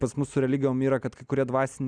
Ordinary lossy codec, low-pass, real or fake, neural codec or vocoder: Opus, 64 kbps; 9.9 kHz; real; none